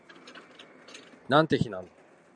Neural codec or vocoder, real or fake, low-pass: none; real; 9.9 kHz